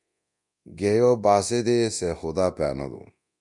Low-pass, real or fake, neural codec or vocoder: 10.8 kHz; fake; codec, 24 kHz, 0.9 kbps, DualCodec